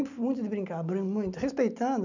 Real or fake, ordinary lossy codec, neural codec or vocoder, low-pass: real; none; none; 7.2 kHz